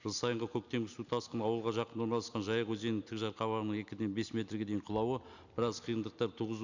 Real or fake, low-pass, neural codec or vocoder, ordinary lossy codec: real; 7.2 kHz; none; none